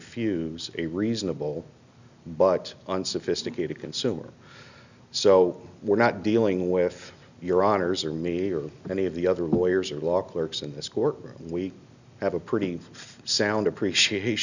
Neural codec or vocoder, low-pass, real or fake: none; 7.2 kHz; real